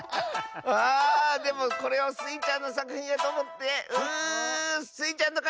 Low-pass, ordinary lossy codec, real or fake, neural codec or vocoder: none; none; real; none